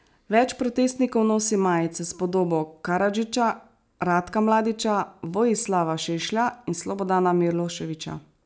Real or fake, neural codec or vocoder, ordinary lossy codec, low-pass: real; none; none; none